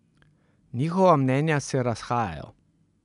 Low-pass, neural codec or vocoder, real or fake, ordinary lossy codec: 10.8 kHz; none; real; none